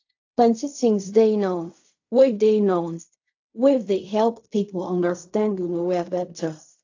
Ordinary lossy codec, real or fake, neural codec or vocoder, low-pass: AAC, 48 kbps; fake; codec, 16 kHz in and 24 kHz out, 0.4 kbps, LongCat-Audio-Codec, fine tuned four codebook decoder; 7.2 kHz